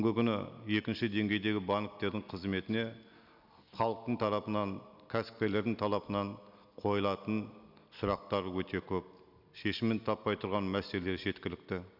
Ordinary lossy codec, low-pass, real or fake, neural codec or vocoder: none; 5.4 kHz; real; none